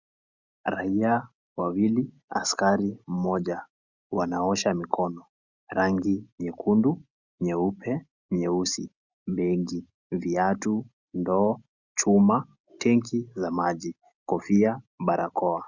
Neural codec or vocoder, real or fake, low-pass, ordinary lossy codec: none; real; 7.2 kHz; Opus, 64 kbps